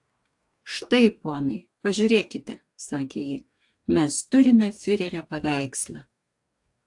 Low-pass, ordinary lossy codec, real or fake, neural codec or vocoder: 10.8 kHz; AAC, 64 kbps; fake; codec, 44.1 kHz, 2.6 kbps, DAC